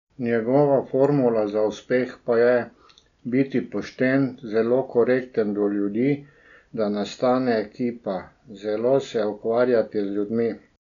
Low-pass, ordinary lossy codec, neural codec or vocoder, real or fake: 7.2 kHz; none; none; real